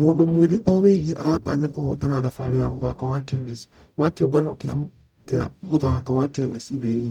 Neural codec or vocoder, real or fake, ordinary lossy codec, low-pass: codec, 44.1 kHz, 0.9 kbps, DAC; fake; none; 19.8 kHz